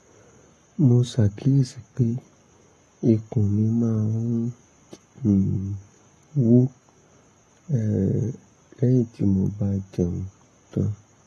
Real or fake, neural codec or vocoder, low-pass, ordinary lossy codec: fake; codec, 44.1 kHz, 7.8 kbps, Pupu-Codec; 19.8 kHz; AAC, 32 kbps